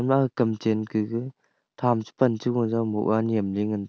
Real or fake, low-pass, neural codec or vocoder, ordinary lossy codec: real; none; none; none